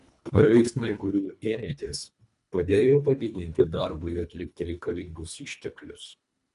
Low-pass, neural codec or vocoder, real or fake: 10.8 kHz; codec, 24 kHz, 1.5 kbps, HILCodec; fake